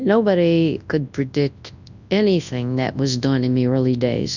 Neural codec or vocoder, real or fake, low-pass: codec, 24 kHz, 0.9 kbps, WavTokenizer, large speech release; fake; 7.2 kHz